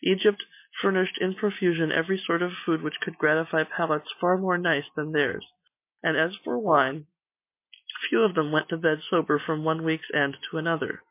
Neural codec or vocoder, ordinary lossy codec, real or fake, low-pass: none; MP3, 24 kbps; real; 3.6 kHz